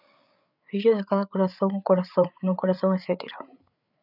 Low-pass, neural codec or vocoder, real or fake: 5.4 kHz; codec, 16 kHz, 8 kbps, FreqCodec, larger model; fake